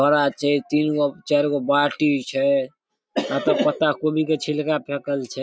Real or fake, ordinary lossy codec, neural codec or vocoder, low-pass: real; none; none; none